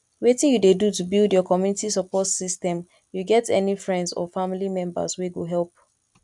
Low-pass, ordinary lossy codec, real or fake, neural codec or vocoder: 10.8 kHz; none; real; none